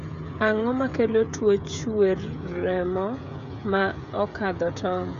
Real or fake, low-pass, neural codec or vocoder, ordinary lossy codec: fake; 7.2 kHz; codec, 16 kHz, 16 kbps, FreqCodec, smaller model; none